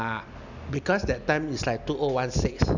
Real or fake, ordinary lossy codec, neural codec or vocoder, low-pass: real; none; none; 7.2 kHz